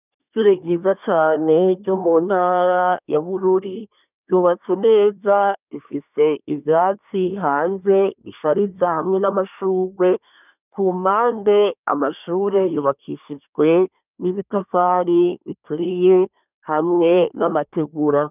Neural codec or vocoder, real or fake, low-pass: codec, 24 kHz, 1 kbps, SNAC; fake; 3.6 kHz